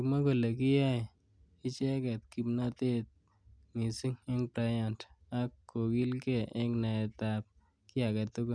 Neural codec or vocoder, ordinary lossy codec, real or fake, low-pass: none; none; real; 9.9 kHz